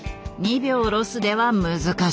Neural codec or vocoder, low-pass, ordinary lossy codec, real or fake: none; none; none; real